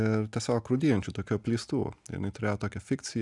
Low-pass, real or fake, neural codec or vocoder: 10.8 kHz; real; none